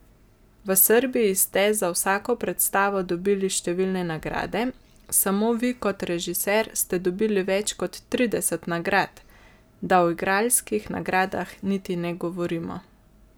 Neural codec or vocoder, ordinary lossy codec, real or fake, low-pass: none; none; real; none